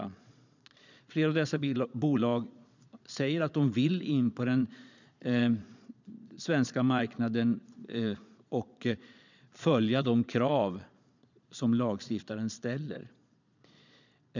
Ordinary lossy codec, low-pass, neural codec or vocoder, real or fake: none; 7.2 kHz; vocoder, 22.05 kHz, 80 mel bands, Vocos; fake